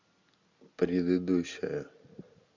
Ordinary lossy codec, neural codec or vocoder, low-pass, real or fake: MP3, 64 kbps; none; 7.2 kHz; real